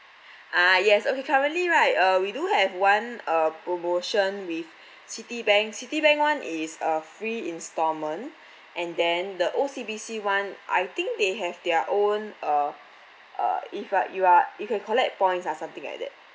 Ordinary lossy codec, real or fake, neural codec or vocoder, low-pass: none; real; none; none